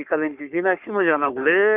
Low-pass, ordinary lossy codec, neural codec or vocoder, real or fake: 3.6 kHz; none; codec, 44.1 kHz, 3.4 kbps, Pupu-Codec; fake